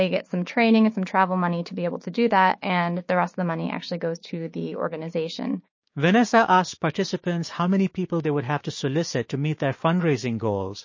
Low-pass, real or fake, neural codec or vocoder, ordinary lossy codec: 7.2 kHz; fake; codec, 16 kHz, 6 kbps, DAC; MP3, 32 kbps